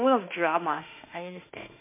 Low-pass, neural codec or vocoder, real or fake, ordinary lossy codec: 3.6 kHz; autoencoder, 48 kHz, 32 numbers a frame, DAC-VAE, trained on Japanese speech; fake; MP3, 24 kbps